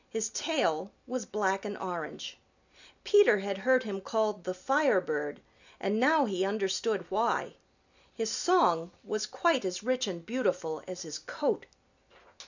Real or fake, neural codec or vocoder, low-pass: real; none; 7.2 kHz